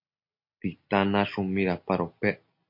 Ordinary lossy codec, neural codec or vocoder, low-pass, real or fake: MP3, 32 kbps; none; 5.4 kHz; real